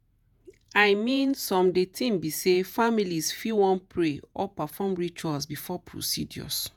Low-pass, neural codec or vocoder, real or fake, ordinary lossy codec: none; vocoder, 48 kHz, 128 mel bands, Vocos; fake; none